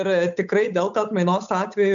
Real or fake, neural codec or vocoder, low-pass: fake; codec, 16 kHz, 8 kbps, FunCodec, trained on Chinese and English, 25 frames a second; 7.2 kHz